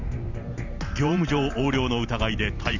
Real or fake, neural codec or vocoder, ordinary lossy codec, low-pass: fake; vocoder, 44.1 kHz, 128 mel bands every 512 samples, BigVGAN v2; none; 7.2 kHz